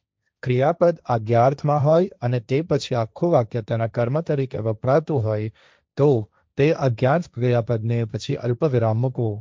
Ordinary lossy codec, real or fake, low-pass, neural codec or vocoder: none; fake; none; codec, 16 kHz, 1.1 kbps, Voila-Tokenizer